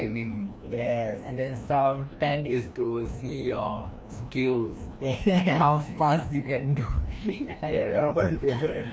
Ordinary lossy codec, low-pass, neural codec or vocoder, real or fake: none; none; codec, 16 kHz, 1 kbps, FreqCodec, larger model; fake